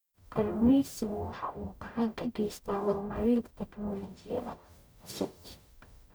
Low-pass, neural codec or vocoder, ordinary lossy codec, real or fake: none; codec, 44.1 kHz, 0.9 kbps, DAC; none; fake